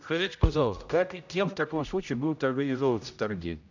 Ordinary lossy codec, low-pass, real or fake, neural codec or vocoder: none; 7.2 kHz; fake; codec, 16 kHz, 0.5 kbps, X-Codec, HuBERT features, trained on general audio